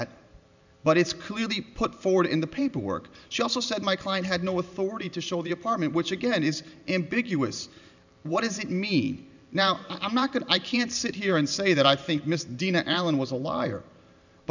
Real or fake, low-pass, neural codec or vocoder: fake; 7.2 kHz; vocoder, 44.1 kHz, 128 mel bands every 512 samples, BigVGAN v2